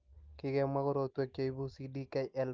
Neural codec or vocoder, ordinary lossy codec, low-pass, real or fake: none; Opus, 24 kbps; 7.2 kHz; real